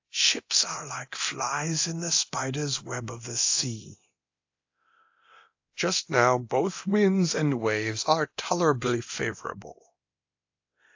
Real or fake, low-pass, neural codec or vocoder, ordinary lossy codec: fake; 7.2 kHz; codec, 24 kHz, 0.9 kbps, DualCodec; AAC, 48 kbps